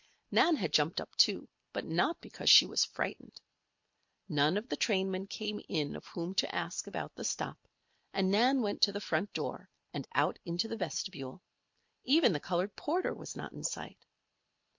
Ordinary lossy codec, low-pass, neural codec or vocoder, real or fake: MP3, 48 kbps; 7.2 kHz; none; real